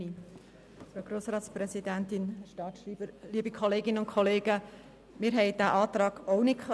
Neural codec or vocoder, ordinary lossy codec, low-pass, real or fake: none; none; none; real